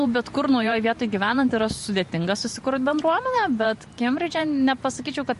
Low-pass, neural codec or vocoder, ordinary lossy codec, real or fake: 14.4 kHz; vocoder, 44.1 kHz, 128 mel bands every 512 samples, BigVGAN v2; MP3, 48 kbps; fake